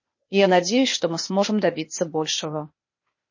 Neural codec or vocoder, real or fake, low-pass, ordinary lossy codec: codec, 16 kHz, 0.8 kbps, ZipCodec; fake; 7.2 kHz; MP3, 32 kbps